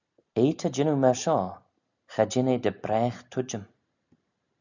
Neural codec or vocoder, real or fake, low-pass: none; real; 7.2 kHz